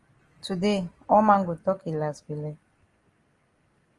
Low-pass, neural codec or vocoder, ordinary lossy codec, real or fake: 10.8 kHz; none; Opus, 24 kbps; real